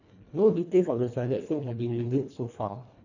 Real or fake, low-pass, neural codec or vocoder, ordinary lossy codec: fake; 7.2 kHz; codec, 24 kHz, 1.5 kbps, HILCodec; none